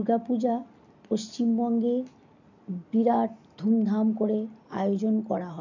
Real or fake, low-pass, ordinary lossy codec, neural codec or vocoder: real; 7.2 kHz; none; none